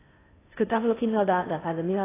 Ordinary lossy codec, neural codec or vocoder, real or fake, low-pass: AAC, 16 kbps; codec, 16 kHz in and 24 kHz out, 0.6 kbps, FocalCodec, streaming, 2048 codes; fake; 3.6 kHz